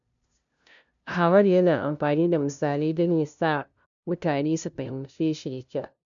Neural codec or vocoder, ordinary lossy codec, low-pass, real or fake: codec, 16 kHz, 0.5 kbps, FunCodec, trained on LibriTTS, 25 frames a second; none; 7.2 kHz; fake